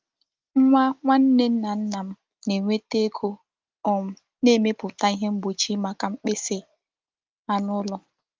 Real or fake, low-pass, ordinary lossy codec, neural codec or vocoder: real; 7.2 kHz; Opus, 32 kbps; none